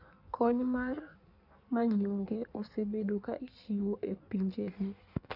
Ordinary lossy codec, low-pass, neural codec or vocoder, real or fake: none; 5.4 kHz; codec, 16 kHz in and 24 kHz out, 1.1 kbps, FireRedTTS-2 codec; fake